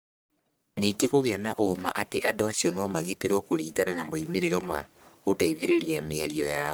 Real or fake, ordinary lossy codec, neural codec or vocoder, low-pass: fake; none; codec, 44.1 kHz, 1.7 kbps, Pupu-Codec; none